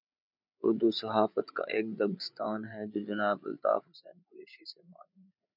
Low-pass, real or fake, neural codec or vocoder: 5.4 kHz; fake; codec, 16 kHz, 8 kbps, FreqCodec, larger model